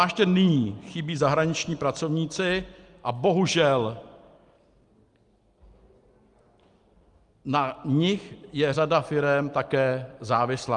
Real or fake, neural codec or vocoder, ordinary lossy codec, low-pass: real; none; Opus, 32 kbps; 10.8 kHz